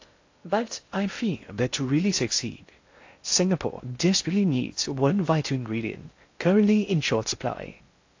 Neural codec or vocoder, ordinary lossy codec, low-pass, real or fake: codec, 16 kHz in and 24 kHz out, 0.6 kbps, FocalCodec, streaming, 2048 codes; AAC, 48 kbps; 7.2 kHz; fake